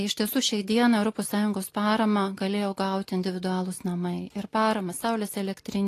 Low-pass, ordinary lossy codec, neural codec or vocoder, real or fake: 14.4 kHz; AAC, 48 kbps; none; real